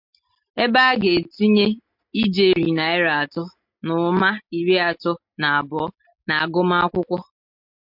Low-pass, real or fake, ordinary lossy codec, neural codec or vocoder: 5.4 kHz; real; MP3, 48 kbps; none